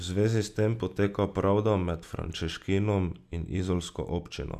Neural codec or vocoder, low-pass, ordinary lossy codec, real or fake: none; 14.4 kHz; none; real